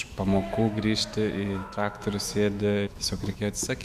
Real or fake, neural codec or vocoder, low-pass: fake; autoencoder, 48 kHz, 128 numbers a frame, DAC-VAE, trained on Japanese speech; 14.4 kHz